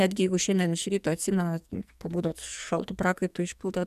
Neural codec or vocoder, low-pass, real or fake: codec, 44.1 kHz, 2.6 kbps, SNAC; 14.4 kHz; fake